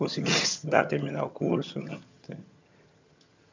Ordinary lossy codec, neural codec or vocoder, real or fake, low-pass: MP3, 64 kbps; vocoder, 22.05 kHz, 80 mel bands, HiFi-GAN; fake; 7.2 kHz